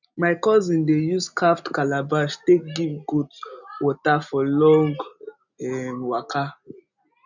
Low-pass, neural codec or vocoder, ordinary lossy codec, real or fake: 7.2 kHz; none; none; real